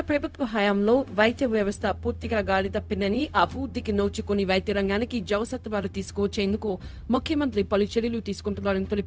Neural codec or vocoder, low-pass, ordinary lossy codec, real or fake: codec, 16 kHz, 0.4 kbps, LongCat-Audio-Codec; none; none; fake